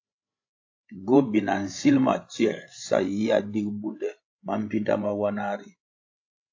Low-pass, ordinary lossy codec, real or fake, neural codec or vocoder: 7.2 kHz; AAC, 48 kbps; fake; codec, 16 kHz, 16 kbps, FreqCodec, larger model